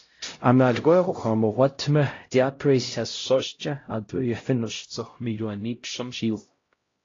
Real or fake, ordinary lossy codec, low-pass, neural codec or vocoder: fake; AAC, 32 kbps; 7.2 kHz; codec, 16 kHz, 0.5 kbps, X-Codec, HuBERT features, trained on LibriSpeech